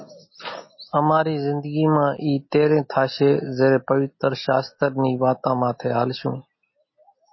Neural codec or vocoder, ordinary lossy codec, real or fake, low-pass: none; MP3, 24 kbps; real; 7.2 kHz